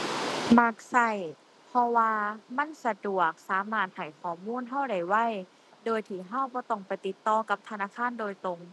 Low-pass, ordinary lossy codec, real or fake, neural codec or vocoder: none; none; real; none